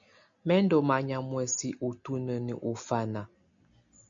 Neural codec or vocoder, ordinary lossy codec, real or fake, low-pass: none; MP3, 64 kbps; real; 7.2 kHz